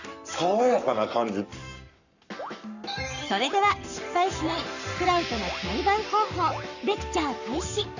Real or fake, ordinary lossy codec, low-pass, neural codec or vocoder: fake; none; 7.2 kHz; codec, 44.1 kHz, 7.8 kbps, Pupu-Codec